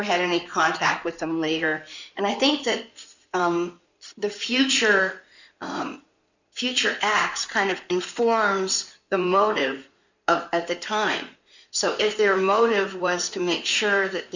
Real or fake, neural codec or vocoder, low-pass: fake; codec, 16 kHz in and 24 kHz out, 2.2 kbps, FireRedTTS-2 codec; 7.2 kHz